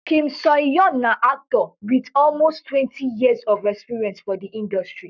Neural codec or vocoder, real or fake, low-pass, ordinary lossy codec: codec, 44.1 kHz, 7.8 kbps, Pupu-Codec; fake; 7.2 kHz; none